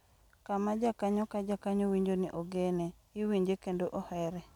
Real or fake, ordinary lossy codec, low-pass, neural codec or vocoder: real; none; 19.8 kHz; none